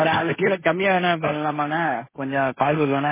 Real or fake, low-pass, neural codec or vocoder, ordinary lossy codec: fake; 3.6 kHz; codec, 16 kHz, 1.1 kbps, Voila-Tokenizer; MP3, 16 kbps